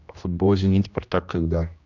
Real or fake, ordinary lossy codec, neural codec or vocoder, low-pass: fake; none; codec, 16 kHz, 1 kbps, X-Codec, HuBERT features, trained on general audio; 7.2 kHz